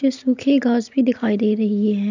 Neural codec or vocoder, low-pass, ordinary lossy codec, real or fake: none; 7.2 kHz; none; real